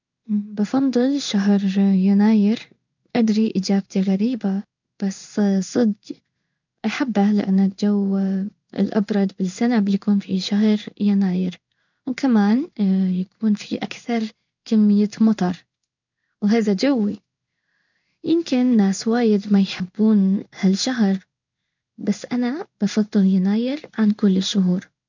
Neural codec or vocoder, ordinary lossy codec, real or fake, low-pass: codec, 16 kHz in and 24 kHz out, 1 kbps, XY-Tokenizer; none; fake; 7.2 kHz